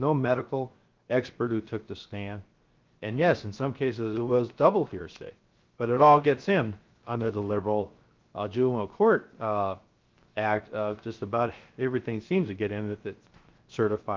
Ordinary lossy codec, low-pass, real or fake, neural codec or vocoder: Opus, 32 kbps; 7.2 kHz; fake; codec, 16 kHz, 0.7 kbps, FocalCodec